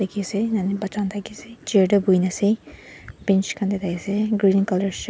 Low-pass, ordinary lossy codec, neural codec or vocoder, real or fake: none; none; none; real